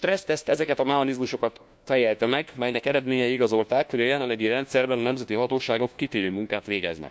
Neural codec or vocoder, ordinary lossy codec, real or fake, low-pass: codec, 16 kHz, 1 kbps, FunCodec, trained on LibriTTS, 50 frames a second; none; fake; none